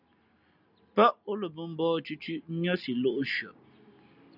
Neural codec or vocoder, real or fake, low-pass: none; real; 5.4 kHz